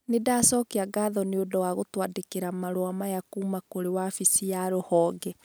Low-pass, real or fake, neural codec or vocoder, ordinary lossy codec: none; real; none; none